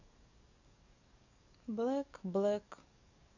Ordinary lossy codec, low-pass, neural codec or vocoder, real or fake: none; 7.2 kHz; codec, 44.1 kHz, 7.8 kbps, DAC; fake